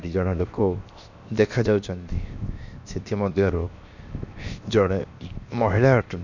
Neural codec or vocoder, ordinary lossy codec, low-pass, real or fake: codec, 16 kHz, 0.7 kbps, FocalCodec; none; 7.2 kHz; fake